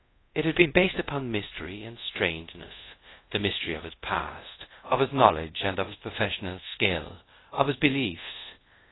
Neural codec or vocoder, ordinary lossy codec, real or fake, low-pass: codec, 24 kHz, 0.5 kbps, DualCodec; AAC, 16 kbps; fake; 7.2 kHz